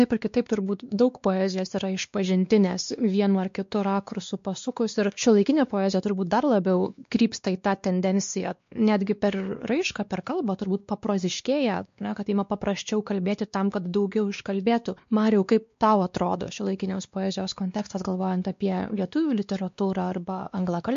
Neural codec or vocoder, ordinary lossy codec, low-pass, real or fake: codec, 16 kHz, 2 kbps, X-Codec, WavLM features, trained on Multilingual LibriSpeech; MP3, 48 kbps; 7.2 kHz; fake